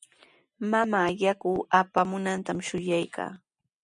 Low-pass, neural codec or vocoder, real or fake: 10.8 kHz; none; real